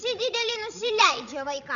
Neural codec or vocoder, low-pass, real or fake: codec, 16 kHz, 16 kbps, FreqCodec, larger model; 7.2 kHz; fake